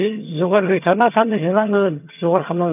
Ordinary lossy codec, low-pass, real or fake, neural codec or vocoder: none; 3.6 kHz; fake; vocoder, 22.05 kHz, 80 mel bands, HiFi-GAN